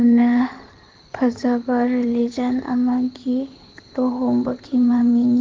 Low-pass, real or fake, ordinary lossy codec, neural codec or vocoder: 7.2 kHz; fake; Opus, 16 kbps; codec, 16 kHz in and 24 kHz out, 2.2 kbps, FireRedTTS-2 codec